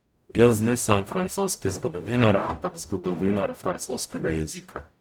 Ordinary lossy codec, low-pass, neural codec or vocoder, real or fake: none; none; codec, 44.1 kHz, 0.9 kbps, DAC; fake